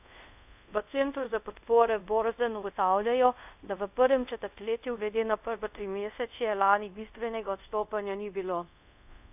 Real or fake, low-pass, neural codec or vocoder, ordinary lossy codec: fake; 3.6 kHz; codec, 24 kHz, 0.5 kbps, DualCodec; none